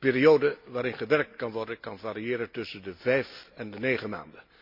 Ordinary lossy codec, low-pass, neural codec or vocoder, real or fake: MP3, 48 kbps; 5.4 kHz; none; real